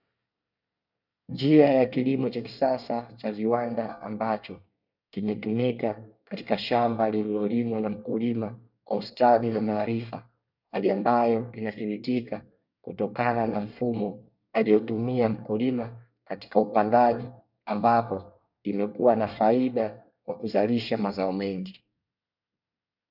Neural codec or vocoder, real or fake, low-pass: codec, 24 kHz, 1 kbps, SNAC; fake; 5.4 kHz